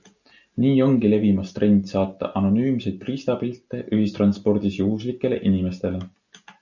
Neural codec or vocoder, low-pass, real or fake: none; 7.2 kHz; real